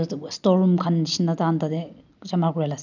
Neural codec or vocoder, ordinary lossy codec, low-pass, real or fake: none; none; 7.2 kHz; real